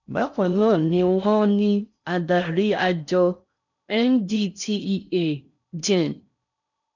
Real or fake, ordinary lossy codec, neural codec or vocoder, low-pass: fake; none; codec, 16 kHz in and 24 kHz out, 0.6 kbps, FocalCodec, streaming, 4096 codes; 7.2 kHz